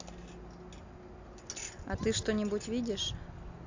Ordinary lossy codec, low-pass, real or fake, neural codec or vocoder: AAC, 48 kbps; 7.2 kHz; real; none